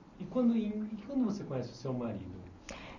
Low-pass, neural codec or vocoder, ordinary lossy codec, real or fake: 7.2 kHz; none; none; real